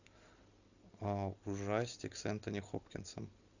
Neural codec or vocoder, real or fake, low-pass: none; real; 7.2 kHz